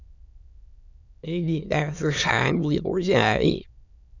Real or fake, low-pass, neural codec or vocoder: fake; 7.2 kHz; autoencoder, 22.05 kHz, a latent of 192 numbers a frame, VITS, trained on many speakers